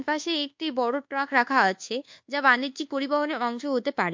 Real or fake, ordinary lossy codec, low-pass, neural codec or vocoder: fake; MP3, 48 kbps; 7.2 kHz; codec, 24 kHz, 1.2 kbps, DualCodec